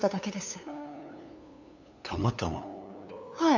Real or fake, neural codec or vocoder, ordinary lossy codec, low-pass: fake; codec, 16 kHz, 8 kbps, FunCodec, trained on LibriTTS, 25 frames a second; none; 7.2 kHz